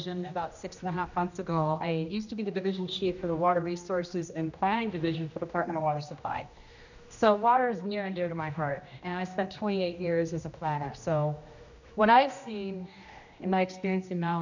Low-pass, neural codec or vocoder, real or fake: 7.2 kHz; codec, 16 kHz, 1 kbps, X-Codec, HuBERT features, trained on general audio; fake